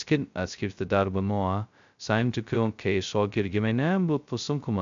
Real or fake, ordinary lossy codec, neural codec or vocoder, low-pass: fake; MP3, 64 kbps; codec, 16 kHz, 0.2 kbps, FocalCodec; 7.2 kHz